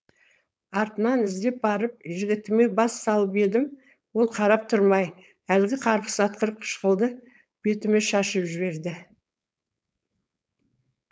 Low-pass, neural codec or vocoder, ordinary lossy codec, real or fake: none; codec, 16 kHz, 4.8 kbps, FACodec; none; fake